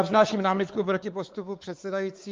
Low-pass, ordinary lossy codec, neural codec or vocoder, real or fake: 7.2 kHz; Opus, 24 kbps; codec, 16 kHz, 4 kbps, X-Codec, WavLM features, trained on Multilingual LibriSpeech; fake